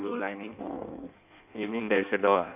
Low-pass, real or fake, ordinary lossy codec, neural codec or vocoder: 3.6 kHz; fake; none; codec, 16 kHz in and 24 kHz out, 1.1 kbps, FireRedTTS-2 codec